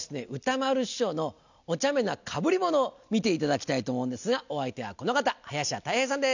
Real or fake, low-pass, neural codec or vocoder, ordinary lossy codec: real; 7.2 kHz; none; none